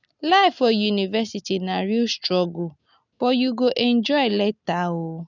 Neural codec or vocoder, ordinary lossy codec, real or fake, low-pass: none; none; real; 7.2 kHz